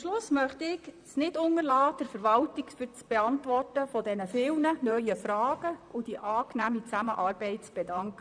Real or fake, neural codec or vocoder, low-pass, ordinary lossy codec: fake; vocoder, 44.1 kHz, 128 mel bands, Pupu-Vocoder; 9.9 kHz; none